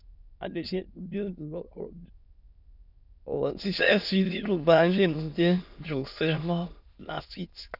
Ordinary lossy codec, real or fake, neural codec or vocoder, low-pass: none; fake; autoencoder, 22.05 kHz, a latent of 192 numbers a frame, VITS, trained on many speakers; 5.4 kHz